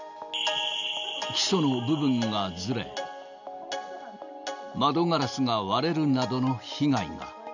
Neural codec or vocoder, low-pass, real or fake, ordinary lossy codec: none; 7.2 kHz; real; none